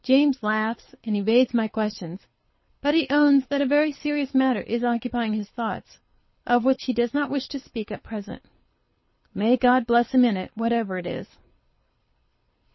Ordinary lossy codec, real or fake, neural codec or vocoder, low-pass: MP3, 24 kbps; fake; codec, 24 kHz, 6 kbps, HILCodec; 7.2 kHz